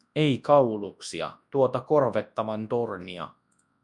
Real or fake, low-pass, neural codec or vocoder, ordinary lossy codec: fake; 10.8 kHz; codec, 24 kHz, 0.9 kbps, WavTokenizer, large speech release; MP3, 96 kbps